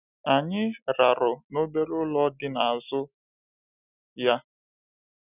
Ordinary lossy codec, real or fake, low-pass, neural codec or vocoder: none; real; 3.6 kHz; none